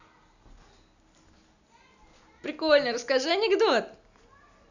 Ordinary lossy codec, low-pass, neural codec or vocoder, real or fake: none; 7.2 kHz; none; real